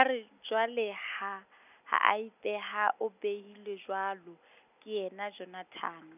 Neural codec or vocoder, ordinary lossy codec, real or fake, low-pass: none; none; real; 3.6 kHz